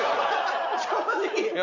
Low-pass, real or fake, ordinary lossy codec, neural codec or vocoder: 7.2 kHz; real; none; none